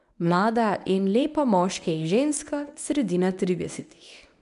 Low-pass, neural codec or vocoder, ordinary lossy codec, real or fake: 10.8 kHz; codec, 24 kHz, 0.9 kbps, WavTokenizer, medium speech release version 1; none; fake